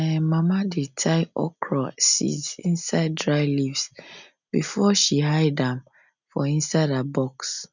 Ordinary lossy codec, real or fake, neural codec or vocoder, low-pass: none; real; none; 7.2 kHz